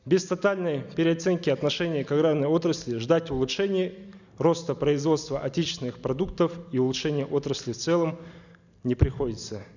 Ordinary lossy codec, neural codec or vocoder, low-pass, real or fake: none; none; 7.2 kHz; real